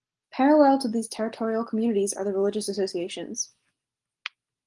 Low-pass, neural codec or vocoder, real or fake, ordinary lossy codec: 10.8 kHz; none; real; Opus, 24 kbps